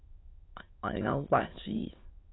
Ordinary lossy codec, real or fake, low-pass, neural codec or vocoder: AAC, 16 kbps; fake; 7.2 kHz; autoencoder, 22.05 kHz, a latent of 192 numbers a frame, VITS, trained on many speakers